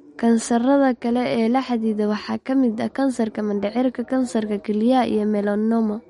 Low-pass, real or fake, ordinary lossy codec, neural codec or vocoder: 19.8 kHz; real; MP3, 48 kbps; none